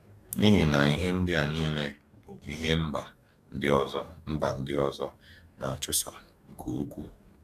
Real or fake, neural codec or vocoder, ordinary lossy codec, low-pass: fake; codec, 44.1 kHz, 2.6 kbps, DAC; none; 14.4 kHz